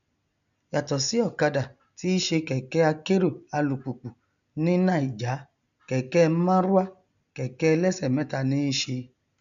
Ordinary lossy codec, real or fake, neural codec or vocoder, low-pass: none; real; none; 7.2 kHz